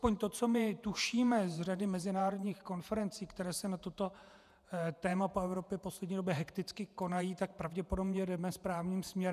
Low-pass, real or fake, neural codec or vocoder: 14.4 kHz; fake; vocoder, 48 kHz, 128 mel bands, Vocos